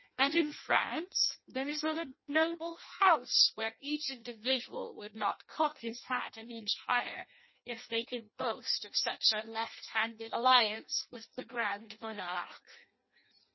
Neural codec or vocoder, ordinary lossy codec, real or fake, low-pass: codec, 16 kHz in and 24 kHz out, 0.6 kbps, FireRedTTS-2 codec; MP3, 24 kbps; fake; 7.2 kHz